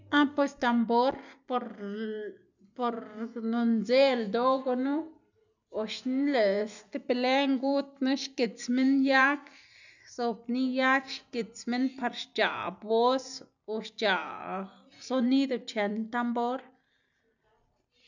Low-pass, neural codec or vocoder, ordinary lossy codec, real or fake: 7.2 kHz; none; none; real